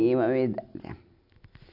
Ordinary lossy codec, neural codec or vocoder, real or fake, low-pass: none; none; real; 5.4 kHz